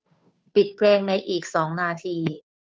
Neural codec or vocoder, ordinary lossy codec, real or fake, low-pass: codec, 16 kHz, 2 kbps, FunCodec, trained on Chinese and English, 25 frames a second; none; fake; none